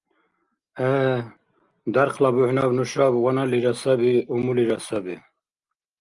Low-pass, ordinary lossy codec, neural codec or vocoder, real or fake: 10.8 kHz; Opus, 32 kbps; none; real